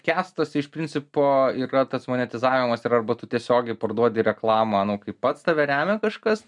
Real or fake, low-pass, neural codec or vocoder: real; 10.8 kHz; none